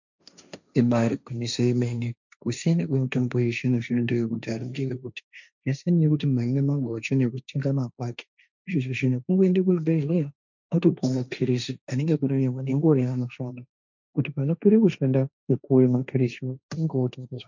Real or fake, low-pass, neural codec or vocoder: fake; 7.2 kHz; codec, 16 kHz, 1.1 kbps, Voila-Tokenizer